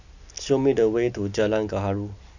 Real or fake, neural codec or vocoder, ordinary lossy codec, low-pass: real; none; none; 7.2 kHz